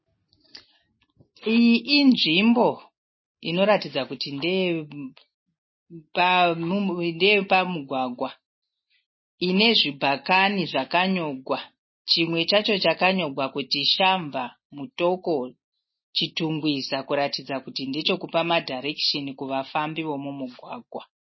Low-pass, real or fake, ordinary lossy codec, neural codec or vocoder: 7.2 kHz; real; MP3, 24 kbps; none